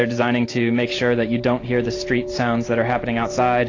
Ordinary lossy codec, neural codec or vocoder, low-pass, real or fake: AAC, 32 kbps; none; 7.2 kHz; real